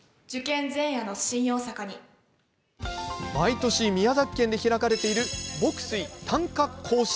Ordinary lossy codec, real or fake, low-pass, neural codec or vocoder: none; real; none; none